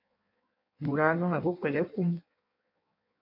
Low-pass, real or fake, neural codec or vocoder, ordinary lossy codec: 5.4 kHz; fake; codec, 16 kHz in and 24 kHz out, 1.1 kbps, FireRedTTS-2 codec; MP3, 32 kbps